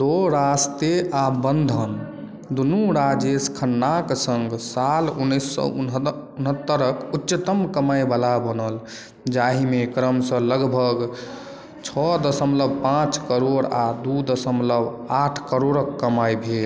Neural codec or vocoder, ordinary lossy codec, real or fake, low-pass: none; none; real; none